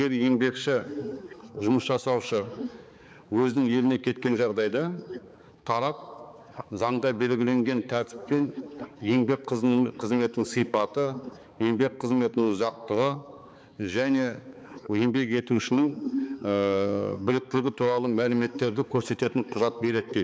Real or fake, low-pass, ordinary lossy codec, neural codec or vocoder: fake; none; none; codec, 16 kHz, 4 kbps, X-Codec, HuBERT features, trained on balanced general audio